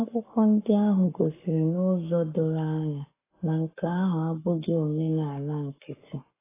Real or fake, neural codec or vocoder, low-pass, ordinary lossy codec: fake; codec, 44.1 kHz, 7.8 kbps, Pupu-Codec; 3.6 kHz; AAC, 16 kbps